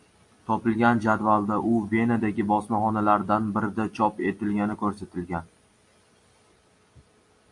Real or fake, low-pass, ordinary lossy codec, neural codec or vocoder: real; 10.8 kHz; AAC, 64 kbps; none